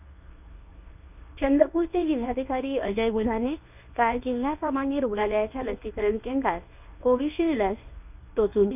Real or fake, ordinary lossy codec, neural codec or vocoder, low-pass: fake; none; codec, 24 kHz, 0.9 kbps, WavTokenizer, medium speech release version 1; 3.6 kHz